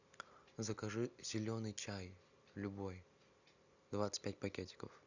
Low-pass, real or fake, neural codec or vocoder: 7.2 kHz; real; none